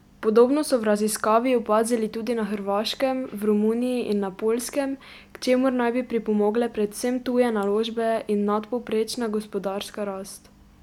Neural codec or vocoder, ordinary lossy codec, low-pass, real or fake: none; none; 19.8 kHz; real